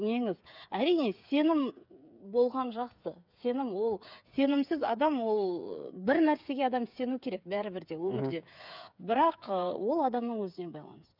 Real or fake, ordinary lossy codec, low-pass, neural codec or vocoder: fake; none; 5.4 kHz; codec, 16 kHz, 8 kbps, FreqCodec, smaller model